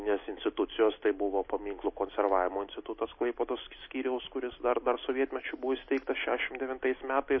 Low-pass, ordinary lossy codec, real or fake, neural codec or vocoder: 7.2 kHz; MP3, 24 kbps; real; none